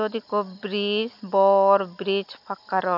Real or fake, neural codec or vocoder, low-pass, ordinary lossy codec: real; none; 5.4 kHz; none